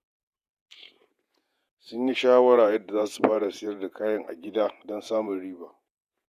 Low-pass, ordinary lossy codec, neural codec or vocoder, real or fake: 14.4 kHz; none; vocoder, 48 kHz, 128 mel bands, Vocos; fake